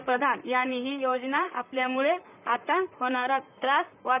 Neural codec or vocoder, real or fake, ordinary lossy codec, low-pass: vocoder, 44.1 kHz, 128 mel bands, Pupu-Vocoder; fake; none; 3.6 kHz